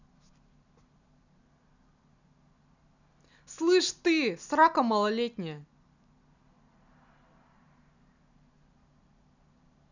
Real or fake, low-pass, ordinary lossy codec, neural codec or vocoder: real; 7.2 kHz; none; none